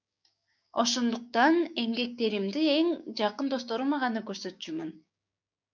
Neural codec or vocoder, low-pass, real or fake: codec, 16 kHz, 6 kbps, DAC; 7.2 kHz; fake